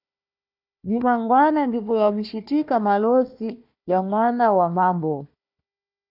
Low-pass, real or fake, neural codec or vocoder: 5.4 kHz; fake; codec, 16 kHz, 1 kbps, FunCodec, trained on Chinese and English, 50 frames a second